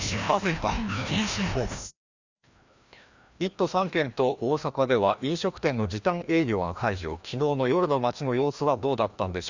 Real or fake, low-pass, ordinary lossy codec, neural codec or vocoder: fake; 7.2 kHz; Opus, 64 kbps; codec, 16 kHz, 1 kbps, FreqCodec, larger model